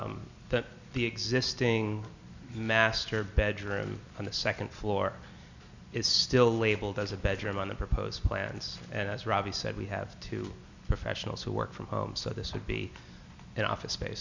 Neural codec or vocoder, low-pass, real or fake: none; 7.2 kHz; real